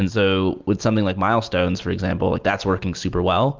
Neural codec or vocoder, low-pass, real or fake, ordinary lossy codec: none; 7.2 kHz; real; Opus, 24 kbps